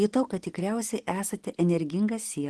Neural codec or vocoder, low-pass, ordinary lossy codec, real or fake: none; 10.8 kHz; Opus, 16 kbps; real